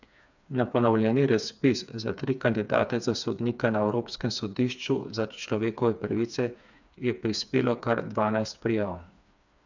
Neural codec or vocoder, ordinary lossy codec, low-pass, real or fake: codec, 16 kHz, 4 kbps, FreqCodec, smaller model; none; 7.2 kHz; fake